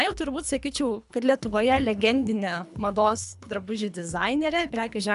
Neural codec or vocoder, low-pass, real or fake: codec, 24 kHz, 3 kbps, HILCodec; 10.8 kHz; fake